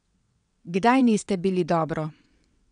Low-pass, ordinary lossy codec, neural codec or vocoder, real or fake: 9.9 kHz; none; vocoder, 22.05 kHz, 80 mel bands, WaveNeXt; fake